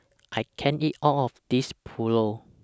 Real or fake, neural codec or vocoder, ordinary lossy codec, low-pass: real; none; none; none